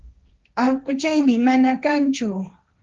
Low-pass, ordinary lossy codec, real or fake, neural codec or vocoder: 7.2 kHz; Opus, 32 kbps; fake; codec, 16 kHz, 1.1 kbps, Voila-Tokenizer